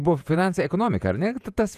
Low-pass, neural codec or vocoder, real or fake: 14.4 kHz; vocoder, 48 kHz, 128 mel bands, Vocos; fake